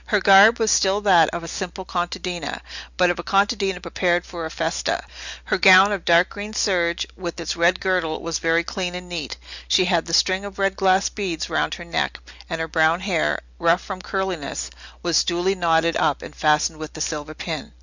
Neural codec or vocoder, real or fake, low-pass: none; real; 7.2 kHz